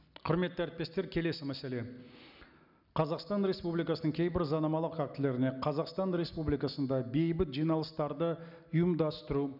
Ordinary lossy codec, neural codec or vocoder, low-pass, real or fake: none; none; 5.4 kHz; real